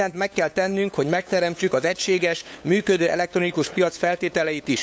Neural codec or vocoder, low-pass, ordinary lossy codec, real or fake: codec, 16 kHz, 8 kbps, FunCodec, trained on LibriTTS, 25 frames a second; none; none; fake